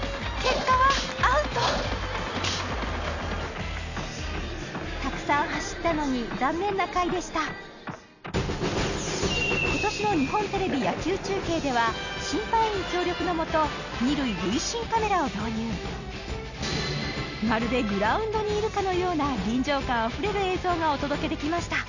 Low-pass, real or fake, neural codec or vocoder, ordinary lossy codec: 7.2 kHz; real; none; none